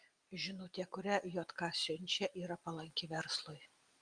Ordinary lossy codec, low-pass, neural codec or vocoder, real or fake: Opus, 32 kbps; 9.9 kHz; none; real